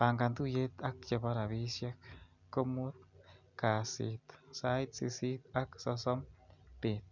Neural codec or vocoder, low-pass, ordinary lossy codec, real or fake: none; 7.2 kHz; none; real